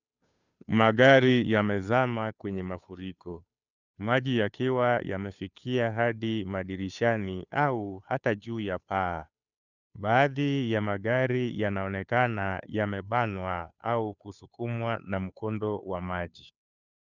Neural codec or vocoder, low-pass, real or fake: codec, 16 kHz, 2 kbps, FunCodec, trained on Chinese and English, 25 frames a second; 7.2 kHz; fake